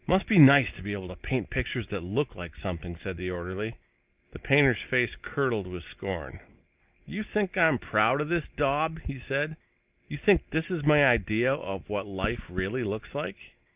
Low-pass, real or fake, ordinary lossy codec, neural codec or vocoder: 3.6 kHz; real; Opus, 24 kbps; none